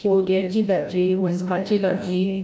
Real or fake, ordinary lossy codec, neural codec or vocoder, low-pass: fake; none; codec, 16 kHz, 0.5 kbps, FreqCodec, larger model; none